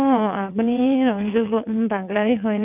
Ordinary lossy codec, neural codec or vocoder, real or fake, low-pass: none; vocoder, 22.05 kHz, 80 mel bands, WaveNeXt; fake; 3.6 kHz